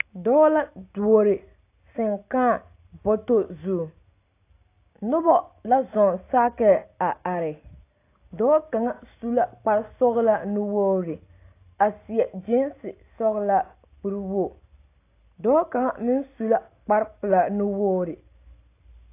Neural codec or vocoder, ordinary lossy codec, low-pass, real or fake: none; AAC, 24 kbps; 3.6 kHz; real